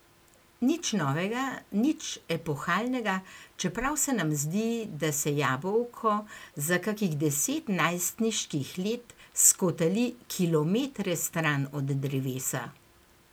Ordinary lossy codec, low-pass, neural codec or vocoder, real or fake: none; none; none; real